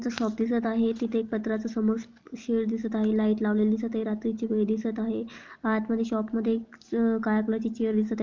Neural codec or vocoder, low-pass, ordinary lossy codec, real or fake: none; 7.2 kHz; Opus, 24 kbps; real